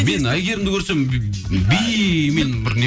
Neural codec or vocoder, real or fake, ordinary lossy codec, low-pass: none; real; none; none